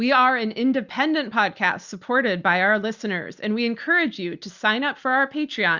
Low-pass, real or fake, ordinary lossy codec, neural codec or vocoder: 7.2 kHz; real; Opus, 64 kbps; none